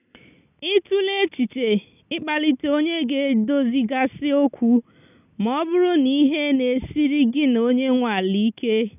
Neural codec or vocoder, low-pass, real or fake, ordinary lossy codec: none; 3.6 kHz; real; none